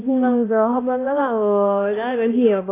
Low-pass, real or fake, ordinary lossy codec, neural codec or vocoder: 3.6 kHz; fake; AAC, 16 kbps; codec, 16 kHz, 1 kbps, X-Codec, HuBERT features, trained on balanced general audio